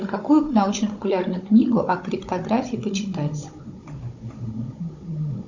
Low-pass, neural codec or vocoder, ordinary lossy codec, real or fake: 7.2 kHz; codec, 16 kHz, 16 kbps, FunCodec, trained on Chinese and English, 50 frames a second; Opus, 64 kbps; fake